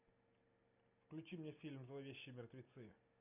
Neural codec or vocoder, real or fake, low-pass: none; real; 3.6 kHz